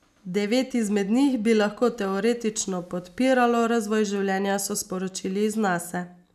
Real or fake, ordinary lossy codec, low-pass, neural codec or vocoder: real; none; 14.4 kHz; none